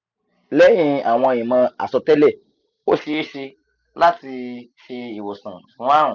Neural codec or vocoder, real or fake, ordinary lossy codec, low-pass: none; real; none; 7.2 kHz